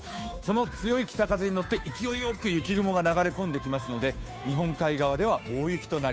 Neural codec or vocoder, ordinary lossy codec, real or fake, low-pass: codec, 16 kHz, 2 kbps, FunCodec, trained on Chinese and English, 25 frames a second; none; fake; none